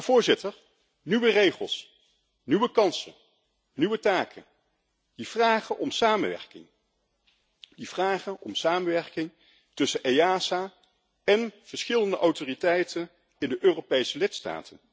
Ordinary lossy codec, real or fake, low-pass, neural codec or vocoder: none; real; none; none